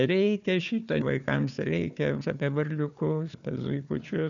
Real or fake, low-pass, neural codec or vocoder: fake; 7.2 kHz; codec, 16 kHz, 4 kbps, FunCodec, trained on Chinese and English, 50 frames a second